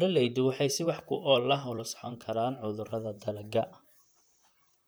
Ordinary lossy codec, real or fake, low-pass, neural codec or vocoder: none; fake; none; vocoder, 44.1 kHz, 128 mel bands, Pupu-Vocoder